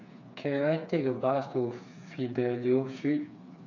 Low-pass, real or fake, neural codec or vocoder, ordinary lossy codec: 7.2 kHz; fake; codec, 16 kHz, 4 kbps, FreqCodec, smaller model; none